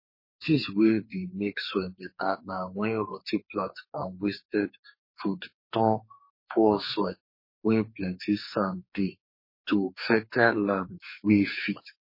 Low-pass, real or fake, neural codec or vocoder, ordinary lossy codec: 5.4 kHz; fake; codec, 44.1 kHz, 2.6 kbps, SNAC; MP3, 24 kbps